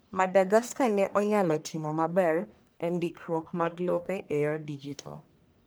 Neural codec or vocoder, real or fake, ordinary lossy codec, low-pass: codec, 44.1 kHz, 1.7 kbps, Pupu-Codec; fake; none; none